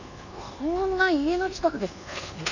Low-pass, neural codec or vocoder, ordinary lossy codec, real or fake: 7.2 kHz; codec, 24 kHz, 1.2 kbps, DualCodec; none; fake